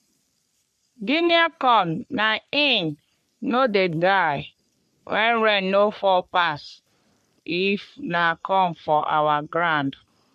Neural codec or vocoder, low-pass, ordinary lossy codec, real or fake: codec, 44.1 kHz, 3.4 kbps, Pupu-Codec; 14.4 kHz; MP3, 64 kbps; fake